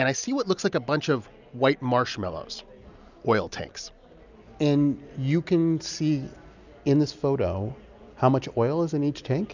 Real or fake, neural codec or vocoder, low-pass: real; none; 7.2 kHz